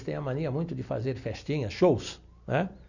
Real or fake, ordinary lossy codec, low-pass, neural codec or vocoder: real; none; 7.2 kHz; none